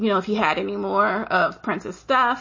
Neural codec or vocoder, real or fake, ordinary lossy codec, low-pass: none; real; MP3, 32 kbps; 7.2 kHz